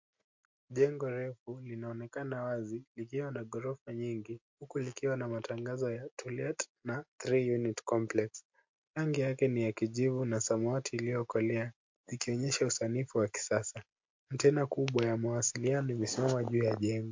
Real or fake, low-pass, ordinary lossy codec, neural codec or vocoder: real; 7.2 kHz; MP3, 48 kbps; none